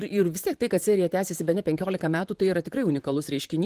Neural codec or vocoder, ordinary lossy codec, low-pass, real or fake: vocoder, 44.1 kHz, 128 mel bands, Pupu-Vocoder; Opus, 24 kbps; 14.4 kHz; fake